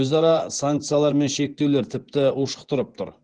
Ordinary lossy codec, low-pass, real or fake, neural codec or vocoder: Opus, 16 kbps; 9.9 kHz; fake; vocoder, 24 kHz, 100 mel bands, Vocos